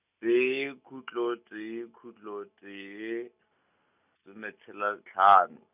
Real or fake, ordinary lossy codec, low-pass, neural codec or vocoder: real; none; 3.6 kHz; none